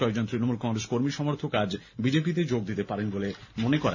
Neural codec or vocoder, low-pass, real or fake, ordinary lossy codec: none; 7.2 kHz; real; none